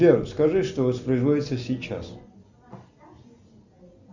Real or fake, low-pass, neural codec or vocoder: real; 7.2 kHz; none